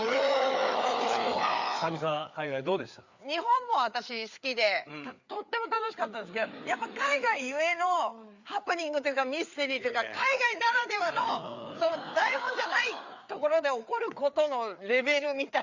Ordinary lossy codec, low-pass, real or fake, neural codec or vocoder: Opus, 64 kbps; 7.2 kHz; fake; codec, 16 kHz, 4 kbps, FreqCodec, larger model